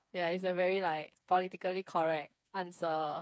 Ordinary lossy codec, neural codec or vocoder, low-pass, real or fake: none; codec, 16 kHz, 4 kbps, FreqCodec, smaller model; none; fake